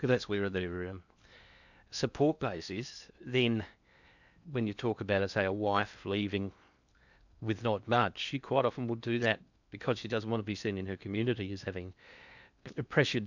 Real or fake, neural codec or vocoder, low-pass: fake; codec, 16 kHz in and 24 kHz out, 0.8 kbps, FocalCodec, streaming, 65536 codes; 7.2 kHz